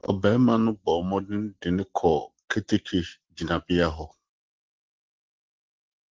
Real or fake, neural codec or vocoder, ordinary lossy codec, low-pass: real; none; Opus, 16 kbps; 7.2 kHz